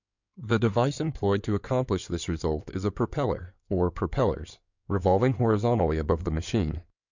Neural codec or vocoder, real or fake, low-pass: codec, 16 kHz in and 24 kHz out, 2.2 kbps, FireRedTTS-2 codec; fake; 7.2 kHz